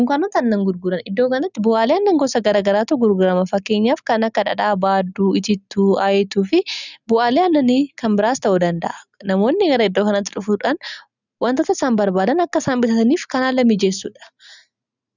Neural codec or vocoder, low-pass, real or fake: none; 7.2 kHz; real